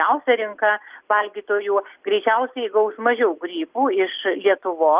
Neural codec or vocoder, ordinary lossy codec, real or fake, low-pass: none; Opus, 32 kbps; real; 3.6 kHz